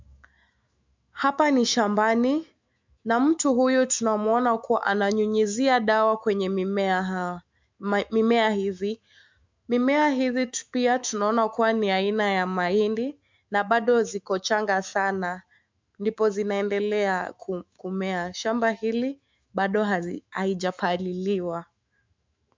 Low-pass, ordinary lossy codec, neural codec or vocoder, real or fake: 7.2 kHz; MP3, 64 kbps; autoencoder, 48 kHz, 128 numbers a frame, DAC-VAE, trained on Japanese speech; fake